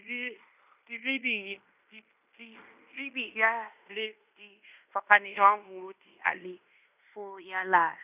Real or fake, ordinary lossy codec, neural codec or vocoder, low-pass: fake; none; codec, 16 kHz in and 24 kHz out, 0.9 kbps, LongCat-Audio-Codec, fine tuned four codebook decoder; 3.6 kHz